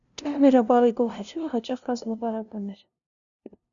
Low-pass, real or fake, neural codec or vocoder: 7.2 kHz; fake; codec, 16 kHz, 0.5 kbps, FunCodec, trained on LibriTTS, 25 frames a second